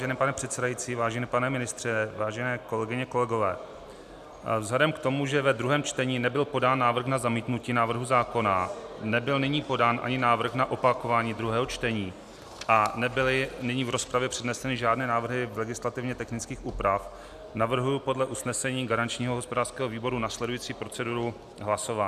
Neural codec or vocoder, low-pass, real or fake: none; 14.4 kHz; real